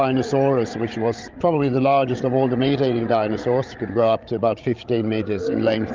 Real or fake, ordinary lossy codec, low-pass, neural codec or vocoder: fake; Opus, 24 kbps; 7.2 kHz; codec, 16 kHz, 16 kbps, FreqCodec, larger model